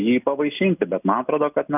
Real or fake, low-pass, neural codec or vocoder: real; 3.6 kHz; none